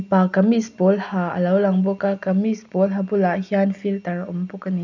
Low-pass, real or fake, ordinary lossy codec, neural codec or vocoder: 7.2 kHz; real; none; none